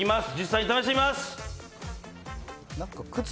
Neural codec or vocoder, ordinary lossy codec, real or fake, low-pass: none; none; real; none